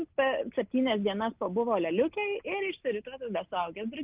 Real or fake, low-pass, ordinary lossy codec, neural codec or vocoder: real; 3.6 kHz; Opus, 32 kbps; none